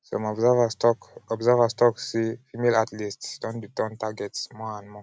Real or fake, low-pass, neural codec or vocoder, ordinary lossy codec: real; none; none; none